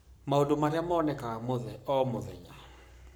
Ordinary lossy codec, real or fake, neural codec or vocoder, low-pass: none; fake; codec, 44.1 kHz, 7.8 kbps, Pupu-Codec; none